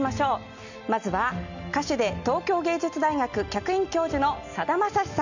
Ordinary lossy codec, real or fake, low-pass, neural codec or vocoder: none; real; 7.2 kHz; none